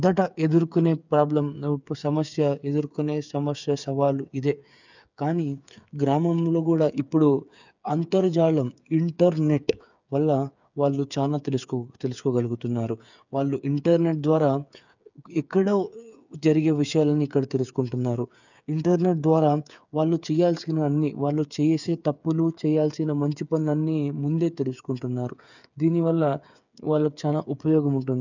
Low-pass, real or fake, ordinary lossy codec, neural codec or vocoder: 7.2 kHz; fake; none; codec, 16 kHz, 8 kbps, FreqCodec, smaller model